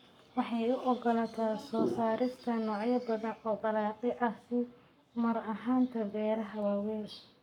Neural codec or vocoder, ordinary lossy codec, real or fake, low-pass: codec, 44.1 kHz, 7.8 kbps, Pupu-Codec; none; fake; 19.8 kHz